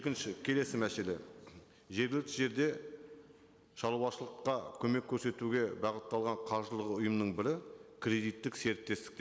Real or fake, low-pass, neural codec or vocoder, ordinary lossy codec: real; none; none; none